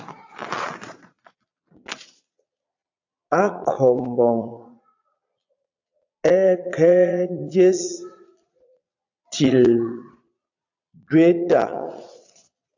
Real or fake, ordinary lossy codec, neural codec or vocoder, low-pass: fake; AAC, 48 kbps; vocoder, 22.05 kHz, 80 mel bands, Vocos; 7.2 kHz